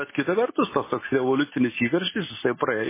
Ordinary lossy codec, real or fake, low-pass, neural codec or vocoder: MP3, 16 kbps; real; 3.6 kHz; none